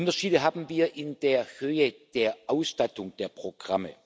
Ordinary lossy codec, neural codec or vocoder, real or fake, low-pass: none; none; real; none